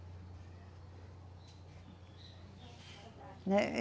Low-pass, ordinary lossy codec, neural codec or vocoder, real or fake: none; none; none; real